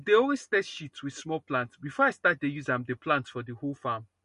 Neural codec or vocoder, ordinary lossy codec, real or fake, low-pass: none; MP3, 48 kbps; real; 10.8 kHz